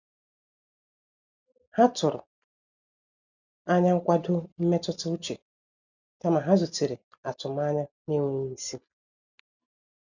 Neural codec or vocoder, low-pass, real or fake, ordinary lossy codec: none; 7.2 kHz; real; none